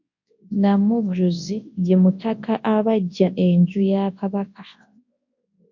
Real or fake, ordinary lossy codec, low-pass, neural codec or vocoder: fake; MP3, 48 kbps; 7.2 kHz; codec, 24 kHz, 0.9 kbps, WavTokenizer, large speech release